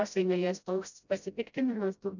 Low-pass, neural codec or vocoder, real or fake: 7.2 kHz; codec, 16 kHz, 0.5 kbps, FreqCodec, smaller model; fake